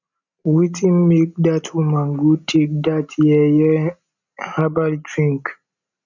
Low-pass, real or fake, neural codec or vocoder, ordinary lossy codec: 7.2 kHz; real; none; none